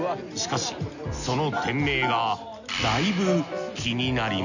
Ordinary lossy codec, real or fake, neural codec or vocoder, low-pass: none; real; none; 7.2 kHz